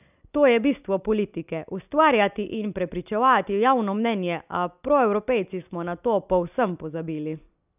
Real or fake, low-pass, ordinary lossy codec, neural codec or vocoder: real; 3.6 kHz; none; none